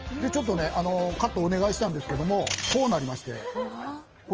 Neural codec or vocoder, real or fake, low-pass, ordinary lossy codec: none; real; 7.2 kHz; Opus, 24 kbps